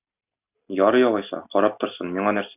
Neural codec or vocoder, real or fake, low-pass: none; real; 3.6 kHz